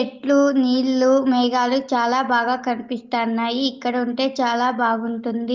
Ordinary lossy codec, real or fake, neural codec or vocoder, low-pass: Opus, 32 kbps; real; none; 7.2 kHz